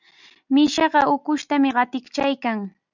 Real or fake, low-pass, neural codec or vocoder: real; 7.2 kHz; none